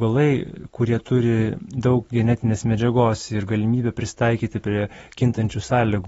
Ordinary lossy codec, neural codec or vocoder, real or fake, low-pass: AAC, 24 kbps; none; real; 19.8 kHz